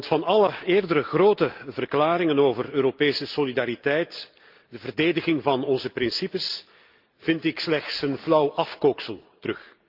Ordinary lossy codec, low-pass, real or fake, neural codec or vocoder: Opus, 24 kbps; 5.4 kHz; real; none